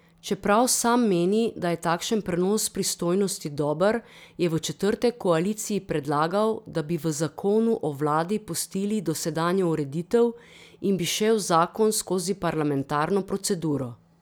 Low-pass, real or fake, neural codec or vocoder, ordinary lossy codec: none; real; none; none